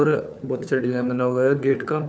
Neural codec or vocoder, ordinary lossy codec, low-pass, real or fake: codec, 16 kHz, 4 kbps, FunCodec, trained on LibriTTS, 50 frames a second; none; none; fake